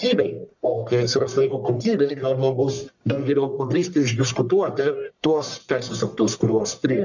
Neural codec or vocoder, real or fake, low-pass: codec, 44.1 kHz, 1.7 kbps, Pupu-Codec; fake; 7.2 kHz